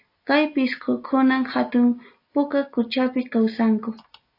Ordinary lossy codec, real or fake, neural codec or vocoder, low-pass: AAC, 32 kbps; real; none; 5.4 kHz